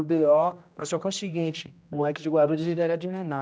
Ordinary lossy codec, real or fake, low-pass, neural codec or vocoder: none; fake; none; codec, 16 kHz, 1 kbps, X-Codec, HuBERT features, trained on general audio